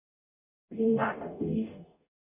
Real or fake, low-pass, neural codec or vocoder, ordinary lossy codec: fake; 3.6 kHz; codec, 44.1 kHz, 0.9 kbps, DAC; none